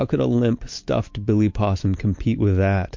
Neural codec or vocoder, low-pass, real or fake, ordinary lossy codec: none; 7.2 kHz; real; MP3, 48 kbps